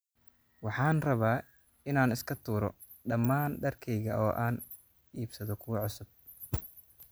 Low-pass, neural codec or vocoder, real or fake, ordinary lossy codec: none; none; real; none